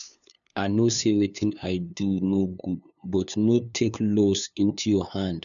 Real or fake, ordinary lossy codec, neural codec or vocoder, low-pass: fake; none; codec, 16 kHz, 2 kbps, FunCodec, trained on LibriTTS, 25 frames a second; 7.2 kHz